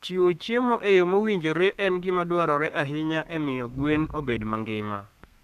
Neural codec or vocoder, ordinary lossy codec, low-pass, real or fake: codec, 32 kHz, 1.9 kbps, SNAC; none; 14.4 kHz; fake